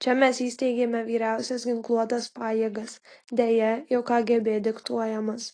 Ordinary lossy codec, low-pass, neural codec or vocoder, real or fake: AAC, 32 kbps; 9.9 kHz; none; real